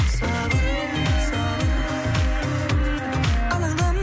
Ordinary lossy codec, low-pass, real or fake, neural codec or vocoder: none; none; real; none